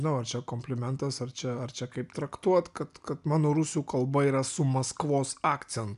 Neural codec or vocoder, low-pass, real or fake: none; 10.8 kHz; real